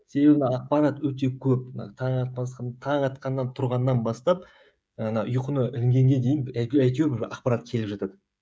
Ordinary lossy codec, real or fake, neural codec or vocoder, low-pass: none; fake; codec, 16 kHz, 16 kbps, FreqCodec, smaller model; none